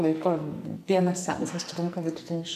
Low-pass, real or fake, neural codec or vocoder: 14.4 kHz; fake; codec, 44.1 kHz, 2.6 kbps, SNAC